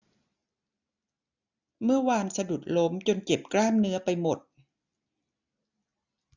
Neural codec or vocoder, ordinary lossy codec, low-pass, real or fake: none; none; 7.2 kHz; real